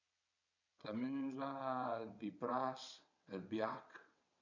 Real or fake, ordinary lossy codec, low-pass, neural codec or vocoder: fake; none; 7.2 kHz; vocoder, 22.05 kHz, 80 mel bands, WaveNeXt